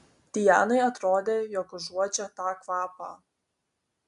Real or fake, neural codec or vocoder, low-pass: real; none; 10.8 kHz